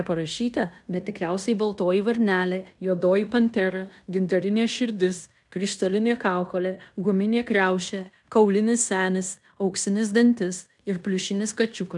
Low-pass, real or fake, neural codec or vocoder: 10.8 kHz; fake; codec, 16 kHz in and 24 kHz out, 0.9 kbps, LongCat-Audio-Codec, fine tuned four codebook decoder